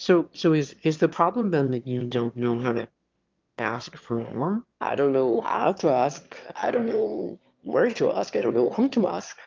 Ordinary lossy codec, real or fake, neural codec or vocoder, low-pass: Opus, 32 kbps; fake; autoencoder, 22.05 kHz, a latent of 192 numbers a frame, VITS, trained on one speaker; 7.2 kHz